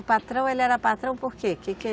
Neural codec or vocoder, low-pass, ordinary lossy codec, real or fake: none; none; none; real